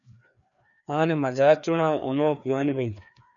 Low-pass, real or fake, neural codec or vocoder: 7.2 kHz; fake; codec, 16 kHz, 2 kbps, FreqCodec, larger model